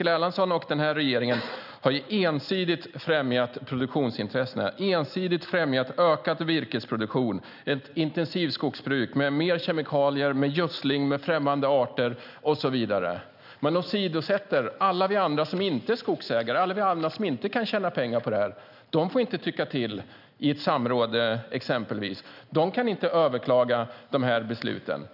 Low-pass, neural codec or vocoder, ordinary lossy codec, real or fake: 5.4 kHz; none; none; real